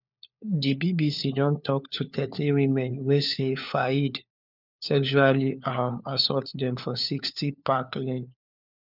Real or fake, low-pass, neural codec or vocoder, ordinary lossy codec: fake; 5.4 kHz; codec, 16 kHz, 4 kbps, FunCodec, trained on LibriTTS, 50 frames a second; none